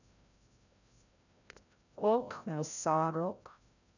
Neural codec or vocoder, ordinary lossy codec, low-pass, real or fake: codec, 16 kHz, 0.5 kbps, FreqCodec, larger model; none; 7.2 kHz; fake